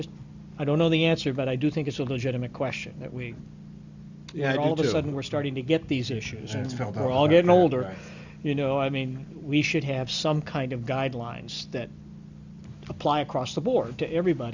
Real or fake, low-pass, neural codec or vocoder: real; 7.2 kHz; none